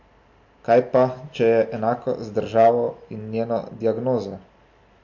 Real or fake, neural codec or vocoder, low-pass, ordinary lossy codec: real; none; 7.2 kHz; MP3, 48 kbps